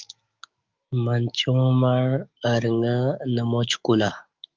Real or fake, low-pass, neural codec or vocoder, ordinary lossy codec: fake; 7.2 kHz; autoencoder, 48 kHz, 128 numbers a frame, DAC-VAE, trained on Japanese speech; Opus, 24 kbps